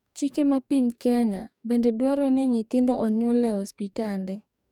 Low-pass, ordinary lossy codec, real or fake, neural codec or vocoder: 19.8 kHz; none; fake; codec, 44.1 kHz, 2.6 kbps, DAC